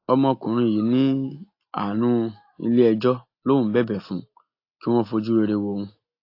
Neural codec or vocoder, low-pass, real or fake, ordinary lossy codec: none; 5.4 kHz; real; AAC, 32 kbps